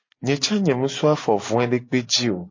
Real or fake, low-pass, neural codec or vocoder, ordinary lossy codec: real; 7.2 kHz; none; MP3, 32 kbps